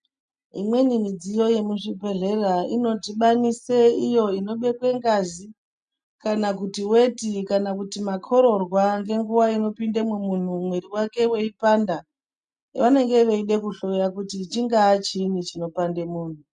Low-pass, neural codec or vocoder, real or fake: 9.9 kHz; none; real